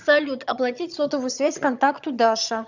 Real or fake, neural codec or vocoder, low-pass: fake; vocoder, 22.05 kHz, 80 mel bands, HiFi-GAN; 7.2 kHz